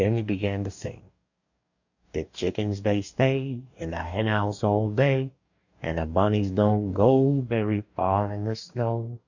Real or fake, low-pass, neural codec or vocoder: fake; 7.2 kHz; codec, 44.1 kHz, 2.6 kbps, DAC